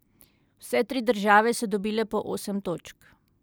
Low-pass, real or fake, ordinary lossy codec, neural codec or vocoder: none; real; none; none